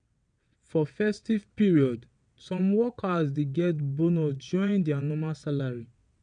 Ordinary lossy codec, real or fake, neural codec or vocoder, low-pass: none; fake; vocoder, 22.05 kHz, 80 mel bands, WaveNeXt; 9.9 kHz